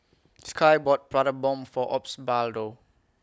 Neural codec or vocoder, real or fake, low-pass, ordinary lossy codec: none; real; none; none